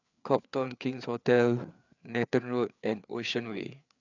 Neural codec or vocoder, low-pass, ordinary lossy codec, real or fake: codec, 16 kHz, 4 kbps, FreqCodec, larger model; 7.2 kHz; none; fake